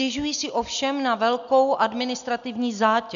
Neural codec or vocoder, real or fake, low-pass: none; real; 7.2 kHz